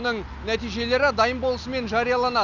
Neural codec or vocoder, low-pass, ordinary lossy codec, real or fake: none; 7.2 kHz; none; real